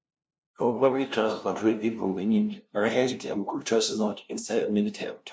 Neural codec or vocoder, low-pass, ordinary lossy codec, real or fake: codec, 16 kHz, 0.5 kbps, FunCodec, trained on LibriTTS, 25 frames a second; none; none; fake